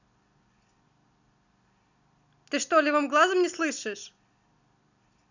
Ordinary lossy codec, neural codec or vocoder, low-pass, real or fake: none; none; 7.2 kHz; real